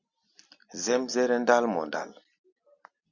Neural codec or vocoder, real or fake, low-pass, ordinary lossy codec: none; real; 7.2 kHz; Opus, 64 kbps